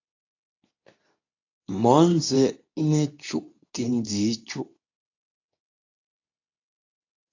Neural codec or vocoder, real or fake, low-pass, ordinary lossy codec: codec, 24 kHz, 0.9 kbps, WavTokenizer, medium speech release version 2; fake; 7.2 kHz; AAC, 48 kbps